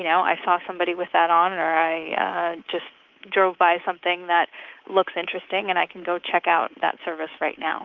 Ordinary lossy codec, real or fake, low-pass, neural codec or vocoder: Opus, 32 kbps; fake; 7.2 kHz; codec, 24 kHz, 3.1 kbps, DualCodec